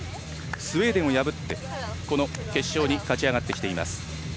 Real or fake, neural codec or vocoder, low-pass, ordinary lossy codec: real; none; none; none